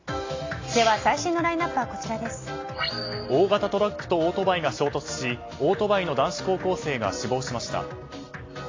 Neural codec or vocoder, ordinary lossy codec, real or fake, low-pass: none; AAC, 32 kbps; real; 7.2 kHz